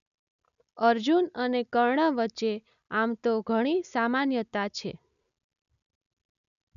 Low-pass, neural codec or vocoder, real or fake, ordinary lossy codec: 7.2 kHz; none; real; none